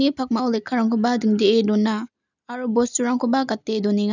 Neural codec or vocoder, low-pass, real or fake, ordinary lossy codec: none; 7.2 kHz; real; none